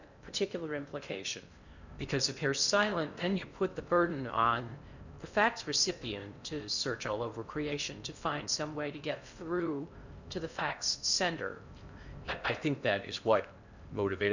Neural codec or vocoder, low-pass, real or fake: codec, 16 kHz in and 24 kHz out, 0.6 kbps, FocalCodec, streaming, 2048 codes; 7.2 kHz; fake